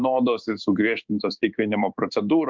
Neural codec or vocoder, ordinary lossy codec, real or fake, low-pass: codec, 16 kHz in and 24 kHz out, 1 kbps, XY-Tokenizer; Opus, 24 kbps; fake; 7.2 kHz